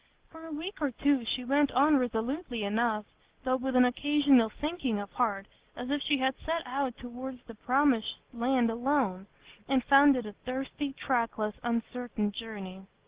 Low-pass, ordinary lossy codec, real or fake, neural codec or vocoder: 3.6 kHz; Opus, 16 kbps; real; none